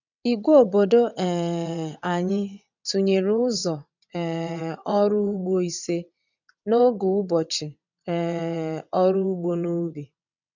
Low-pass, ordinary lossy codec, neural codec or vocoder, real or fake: 7.2 kHz; none; vocoder, 22.05 kHz, 80 mel bands, WaveNeXt; fake